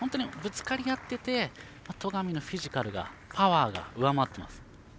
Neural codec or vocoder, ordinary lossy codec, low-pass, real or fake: none; none; none; real